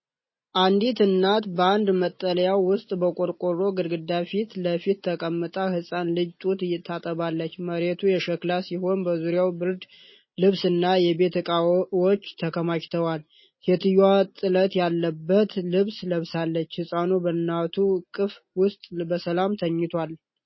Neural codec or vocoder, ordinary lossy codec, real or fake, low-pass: none; MP3, 24 kbps; real; 7.2 kHz